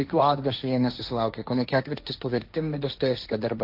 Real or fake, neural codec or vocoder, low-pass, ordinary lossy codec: fake; codec, 16 kHz, 1.1 kbps, Voila-Tokenizer; 5.4 kHz; AAC, 48 kbps